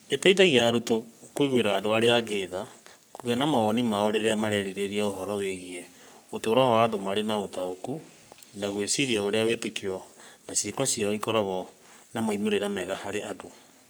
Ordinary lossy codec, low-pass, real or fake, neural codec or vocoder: none; none; fake; codec, 44.1 kHz, 3.4 kbps, Pupu-Codec